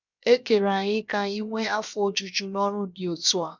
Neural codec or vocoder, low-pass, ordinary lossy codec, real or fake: codec, 16 kHz, 0.7 kbps, FocalCodec; 7.2 kHz; none; fake